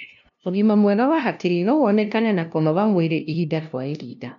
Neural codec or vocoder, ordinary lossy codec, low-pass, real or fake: codec, 16 kHz, 0.5 kbps, FunCodec, trained on LibriTTS, 25 frames a second; MP3, 64 kbps; 7.2 kHz; fake